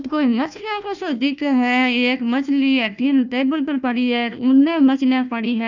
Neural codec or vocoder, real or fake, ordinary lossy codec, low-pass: codec, 16 kHz, 1 kbps, FunCodec, trained on Chinese and English, 50 frames a second; fake; none; 7.2 kHz